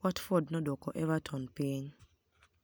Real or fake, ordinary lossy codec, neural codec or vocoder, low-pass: real; none; none; none